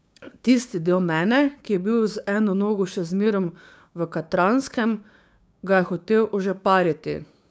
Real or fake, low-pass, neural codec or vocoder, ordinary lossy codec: fake; none; codec, 16 kHz, 6 kbps, DAC; none